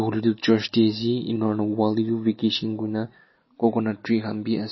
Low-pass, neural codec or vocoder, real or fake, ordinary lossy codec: 7.2 kHz; none; real; MP3, 24 kbps